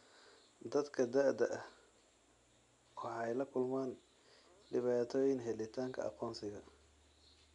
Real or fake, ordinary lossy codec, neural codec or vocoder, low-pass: real; none; none; 10.8 kHz